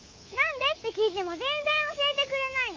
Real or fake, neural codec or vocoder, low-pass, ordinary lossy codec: fake; codec, 24 kHz, 3.1 kbps, DualCodec; 7.2 kHz; Opus, 32 kbps